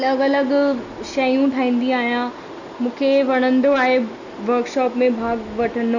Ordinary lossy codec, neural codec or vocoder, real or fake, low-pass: none; none; real; 7.2 kHz